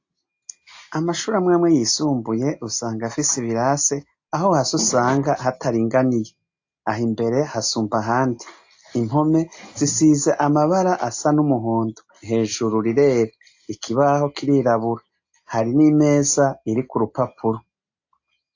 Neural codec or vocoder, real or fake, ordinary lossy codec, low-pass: none; real; AAC, 48 kbps; 7.2 kHz